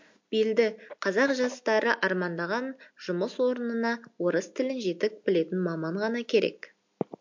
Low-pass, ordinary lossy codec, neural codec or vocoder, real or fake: 7.2 kHz; MP3, 48 kbps; none; real